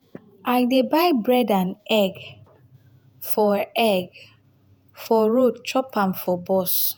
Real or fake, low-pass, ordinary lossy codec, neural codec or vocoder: fake; none; none; vocoder, 48 kHz, 128 mel bands, Vocos